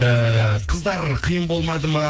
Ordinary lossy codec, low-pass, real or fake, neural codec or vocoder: none; none; fake; codec, 16 kHz, 4 kbps, FreqCodec, smaller model